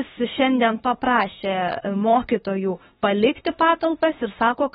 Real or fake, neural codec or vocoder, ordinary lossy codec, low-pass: fake; autoencoder, 48 kHz, 32 numbers a frame, DAC-VAE, trained on Japanese speech; AAC, 16 kbps; 19.8 kHz